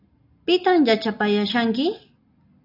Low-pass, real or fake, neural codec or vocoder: 5.4 kHz; real; none